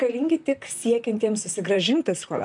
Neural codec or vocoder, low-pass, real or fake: vocoder, 44.1 kHz, 128 mel bands, Pupu-Vocoder; 10.8 kHz; fake